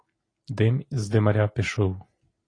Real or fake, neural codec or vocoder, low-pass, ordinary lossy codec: real; none; 9.9 kHz; AAC, 32 kbps